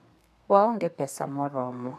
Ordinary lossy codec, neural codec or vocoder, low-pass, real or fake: none; codec, 32 kHz, 1.9 kbps, SNAC; 14.4 kHz; fake